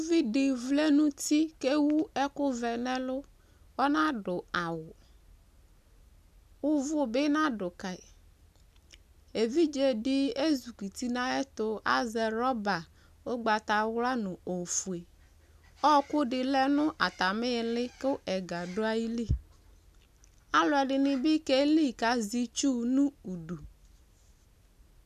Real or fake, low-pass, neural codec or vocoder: real; 14.4 kHz; none